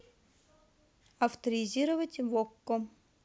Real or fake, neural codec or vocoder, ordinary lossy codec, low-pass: real; none; none; none